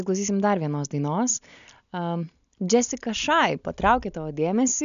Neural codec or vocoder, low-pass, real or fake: none; 7.2 kHz; real